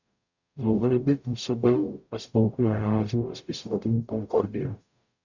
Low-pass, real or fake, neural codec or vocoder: 7.2 kHz; fake; codec, 44.1 kHz, 0.9 kbps, DAC